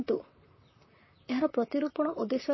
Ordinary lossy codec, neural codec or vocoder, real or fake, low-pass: MP3, 24 kbps; vocoder, 44.1 kHz, 128 mel bands, Pupu-Vocoder; fake; 7.2 kHz